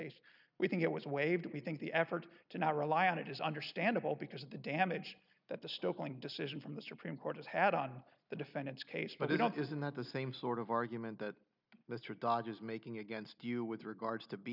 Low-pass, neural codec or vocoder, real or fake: 5.4 kHz; none; real